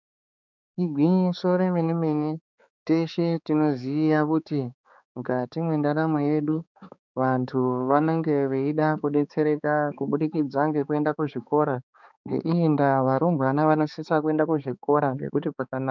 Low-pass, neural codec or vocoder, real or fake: 7.2 kHz; codec, 16 kHz, 4 kbps, X-Codec, HuBERT features, trained on balanced general audio; fake